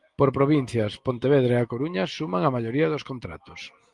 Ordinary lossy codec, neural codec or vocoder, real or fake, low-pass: Opus, 24 kbps; none; real; 10.8 kHz